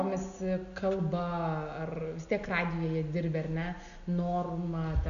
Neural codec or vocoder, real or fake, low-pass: none; real; 7.2 kHz